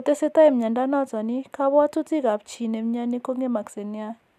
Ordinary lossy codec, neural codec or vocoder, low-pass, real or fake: none; none; 14.4 kHz; real